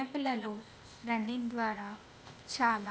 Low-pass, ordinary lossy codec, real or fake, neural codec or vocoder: none; none; fake; codec, 16 kHz, 0.8 kbps, ZipCodec